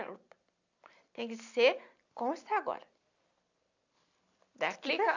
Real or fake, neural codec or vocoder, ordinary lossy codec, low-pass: real; none; none; 7.2 kHz